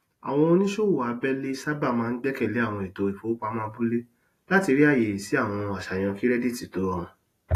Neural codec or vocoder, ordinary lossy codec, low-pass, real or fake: none; AAC, 48 kbps; 14.4 kHz; real